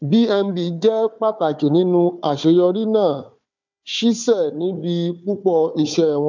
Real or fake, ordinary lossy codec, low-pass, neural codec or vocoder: fake; MP3, 64 kbps; 7.2 kHz; codec, 16 kHz, 4 kbps, FunCodec, trained on Chinese and English, 50 frames a second